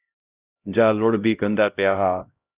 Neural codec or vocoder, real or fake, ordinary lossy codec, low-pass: codec, 16 kHz, 0.5 kbps, X-Codec, HuBERT features, trained on LibriSpeech; fake; Opus, 64 kbps; 3.6 kHz